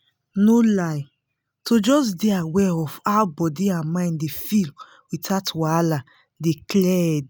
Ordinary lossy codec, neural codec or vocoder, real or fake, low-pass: none; none; real; none